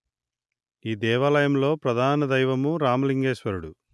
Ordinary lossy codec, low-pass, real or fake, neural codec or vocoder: none; none; real; none